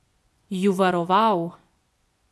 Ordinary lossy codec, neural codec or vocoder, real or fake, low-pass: none; vocoder, 24 kHz, 100 mel bands, Vocos; fake; none